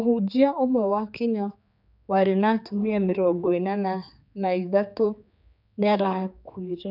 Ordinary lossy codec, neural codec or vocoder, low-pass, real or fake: none; codec, 16 kHz, 4 kbps, X-Codec, HuBERT features, trained on general audio; 5.4 kHz; fake